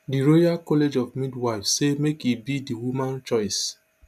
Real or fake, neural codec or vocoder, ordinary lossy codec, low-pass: real; none; none; 14.4 kHz